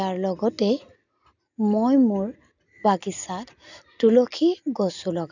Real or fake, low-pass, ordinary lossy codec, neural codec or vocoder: real; 7.2 kHz; none; none